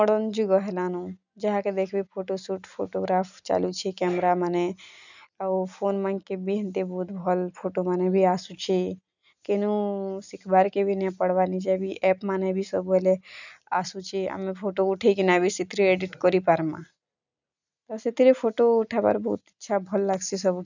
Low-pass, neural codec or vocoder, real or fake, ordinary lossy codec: 7.2 kHz; none; real; none